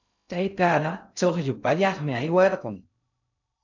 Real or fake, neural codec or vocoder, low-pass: fake; codec, 16 kHz in and 24 kHz out, 0.6 kbps, FocalCodec, streaming, 2048 codes; 7.2 kHz